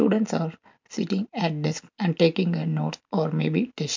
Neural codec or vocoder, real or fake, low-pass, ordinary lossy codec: none; real; 7.2 kHz; MP3, 64 kbps